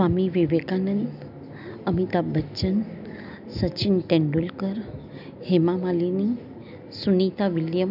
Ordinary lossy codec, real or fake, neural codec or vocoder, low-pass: none; real; none; 5.4 kHz